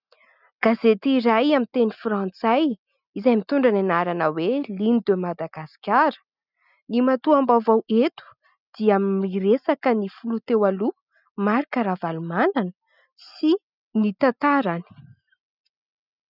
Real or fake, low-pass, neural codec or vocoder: real; 5.4 kHz; none